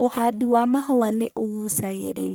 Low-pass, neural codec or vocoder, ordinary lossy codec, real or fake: none; codec, 44.1 kHz, 1.7 kbps, Pupu-Codec; none; fake